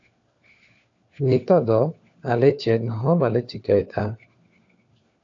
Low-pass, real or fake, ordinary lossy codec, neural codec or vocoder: 7.2 kHz; fake; AAC, 48 kbps; codec, 16 kHz, 4 kbps, FunCodec, trained on LibriTTS, 50 frames a second